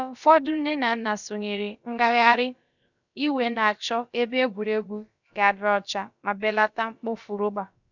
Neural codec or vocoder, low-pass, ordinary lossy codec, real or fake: codec, 16 kHz, about 1 kbps, DyCAST, with the encoder's durations; 7.2 kHz; none; fake